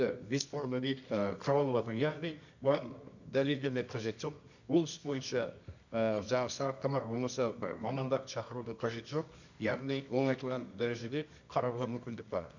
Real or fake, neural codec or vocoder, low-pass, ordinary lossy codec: fake; codec, 24 kHz, 0.9 kbps, WavTokenizer, medium music audio release; 7.2 kHz; none